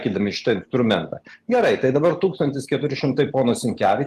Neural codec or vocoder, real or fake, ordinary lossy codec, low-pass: none; real; Opus, 24 kbps; 14.4 kHz